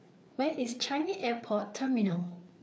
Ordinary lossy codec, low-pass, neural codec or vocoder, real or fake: none; none; codec, 16 kHz, 4 kbps, FreqCodec, larger model; fake